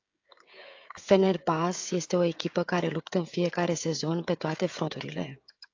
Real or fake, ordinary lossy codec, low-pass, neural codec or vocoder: fake; AAC, 48 kbps; 7.2 kHz; codec, 16 kHz, 16 kbps, FreqCodec, smaller model